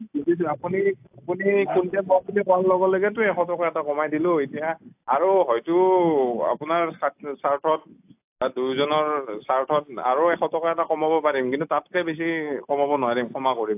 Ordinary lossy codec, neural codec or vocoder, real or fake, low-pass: AAC, 32 kbps; none; real; 3.6 kHz